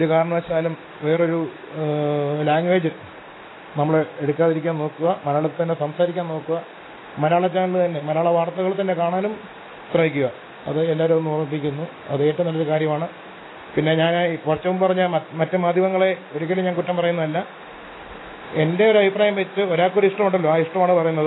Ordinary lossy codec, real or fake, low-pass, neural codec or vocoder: AAC, 16 kbps; real; 7.2 kHz; none